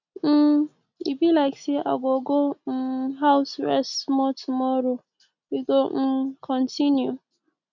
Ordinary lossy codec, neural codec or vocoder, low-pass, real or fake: none; none; 7.2 kHz; real